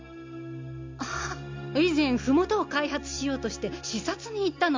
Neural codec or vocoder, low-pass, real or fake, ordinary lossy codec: none; 7.2 kHz; real; MP3, 64 kbps